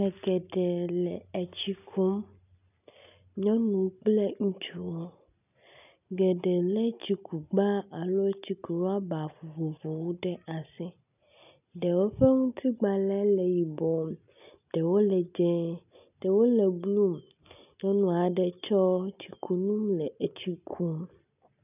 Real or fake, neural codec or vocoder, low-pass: real; none; 3.6 kHz